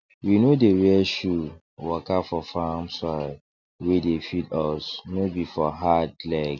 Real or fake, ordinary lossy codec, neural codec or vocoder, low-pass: real; none; none; 7.2 kHz